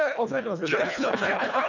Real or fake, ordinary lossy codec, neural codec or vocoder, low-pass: fake; none; codec, 24 kHz, 1.5 kbps, HILCodec; 7.2 kHz